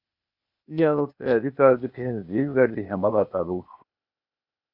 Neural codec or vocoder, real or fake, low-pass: codec, 16 kHz, 0.8 kbps, ZipCodec; fake; 5.4 kHz